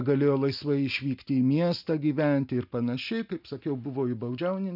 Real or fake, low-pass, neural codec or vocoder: real; 5.4 kHz; none